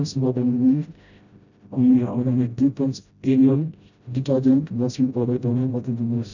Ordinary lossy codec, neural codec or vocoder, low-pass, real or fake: none; codec, 16 kHz, 0.5 kbps, FreqCodec, smaller model; 7.2 kHz; fake